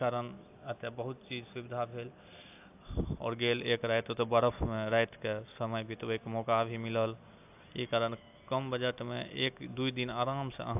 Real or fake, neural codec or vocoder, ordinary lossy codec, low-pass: real; none; AAC, 32 kbps; 3.6 kHz